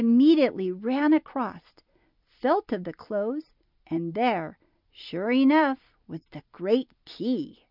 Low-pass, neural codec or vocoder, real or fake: 5.4 kHz; none; real